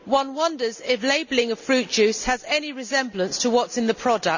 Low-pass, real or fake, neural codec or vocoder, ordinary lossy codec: 7.2 kHz; real; none; none